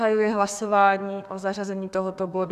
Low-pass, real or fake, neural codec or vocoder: 14.4 kHz; fake; codec, 32 kHz, 1.9 kbps, SNAC